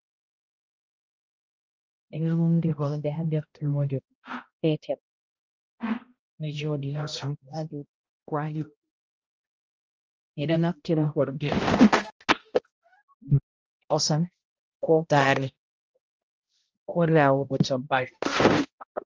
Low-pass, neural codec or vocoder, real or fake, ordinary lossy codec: 7.2 kHz; codec, 16 kHz, 0.5 kbps, X-Codec, HuBERT features, trained on balanced general audio; fake; Opus, 24 kbps